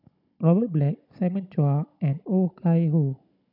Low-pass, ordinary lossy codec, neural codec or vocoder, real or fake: 5.4 kHz; none; codec, 16 kHz, 16 kbps, FunCodec, trained on Chinese and English, 50 frames a second; fake